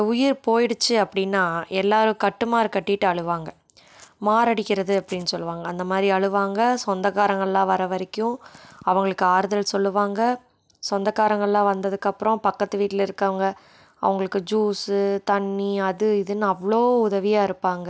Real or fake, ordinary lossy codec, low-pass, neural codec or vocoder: real; none; none; none